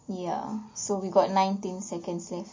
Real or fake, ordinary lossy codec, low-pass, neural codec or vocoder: real; MP3, 32 kbps; 7.2 kHz; none